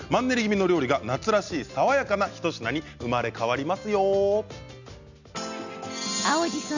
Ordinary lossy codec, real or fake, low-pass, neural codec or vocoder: none; real; 7.2 kHz; none